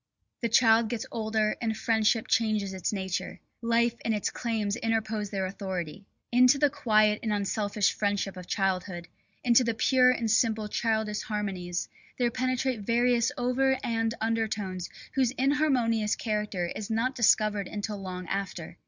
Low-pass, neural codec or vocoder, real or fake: 7.2 kHz; none; real